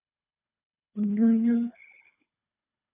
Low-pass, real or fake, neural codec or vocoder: 3.6 kHz; fake; codec, 24 kHz, 3 kbps, HILCodec